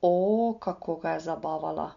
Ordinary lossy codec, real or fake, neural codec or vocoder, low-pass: none; real; none; 7.2 kHz